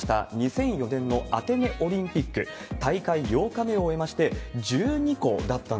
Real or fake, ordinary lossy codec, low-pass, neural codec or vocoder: real; none; none; none